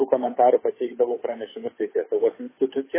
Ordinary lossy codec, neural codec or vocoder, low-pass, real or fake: MP3, 16 kbps; codec, 16 kHz, 8 kbps, FunCodec, trained on Chinese and English, 25 frames a second; 3.6 kHz; fake